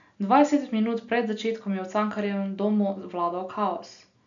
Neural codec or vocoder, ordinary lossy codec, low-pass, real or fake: none; none; 7.2 kHz; real